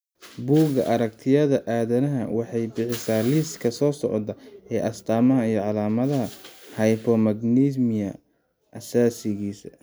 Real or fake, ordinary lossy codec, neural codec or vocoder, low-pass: real; none; none; none